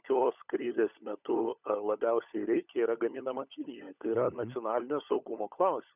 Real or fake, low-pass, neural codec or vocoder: fake; 3.6 kHz; codec, 16 kHz, 16 kbps, FunCodec, trained on LibriTTS, 50 frames a second